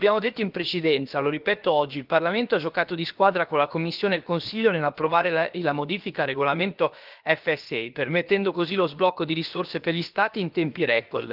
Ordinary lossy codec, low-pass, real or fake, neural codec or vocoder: Opus, 32 kbps; 5.4 kHz; fake; codec, 16 kHz, about 1 kbps, DyCAST, with the encoder's durations